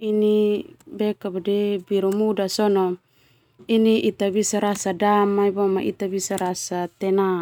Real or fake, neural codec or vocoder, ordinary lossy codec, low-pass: real; none; none; 19.8 kHz